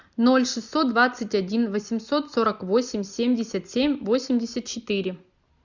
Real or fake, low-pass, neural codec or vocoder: real; 7.2 kHz; none